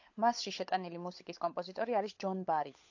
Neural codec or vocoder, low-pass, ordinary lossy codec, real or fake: codec, 16 kHz, 16 kbps, FunCodec, trained on LibriTTS, 50 frames a second; 7.2 kHz; AAC, 48 kbps; fake